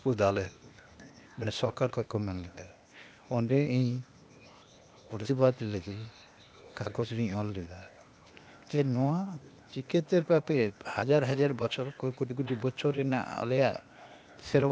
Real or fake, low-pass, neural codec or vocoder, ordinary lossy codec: fake; none; codec, 16 kHz, 0.8 kbps, ZipCodec; none